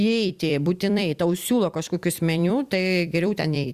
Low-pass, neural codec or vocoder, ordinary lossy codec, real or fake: 14.4 kHz; vocoder, 44.1 kHz, 128 mel bands every 256 samples, BigVGAN v2; Opus, 64 kbps; fake